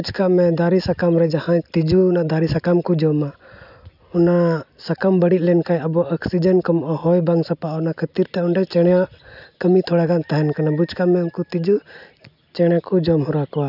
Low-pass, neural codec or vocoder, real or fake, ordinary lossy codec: 5.4 kHz; none; real; none